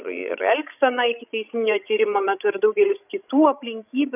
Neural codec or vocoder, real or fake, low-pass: codec, 16 kHz, 16 kbps, FreqCodec, larger model; fake; 3.6 kHz